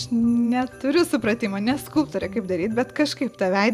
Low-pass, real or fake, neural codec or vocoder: 14.4 kHz; fake; vocoder, 44.1 kHz, 128 mel bands every 512 samples, BigVGAN v2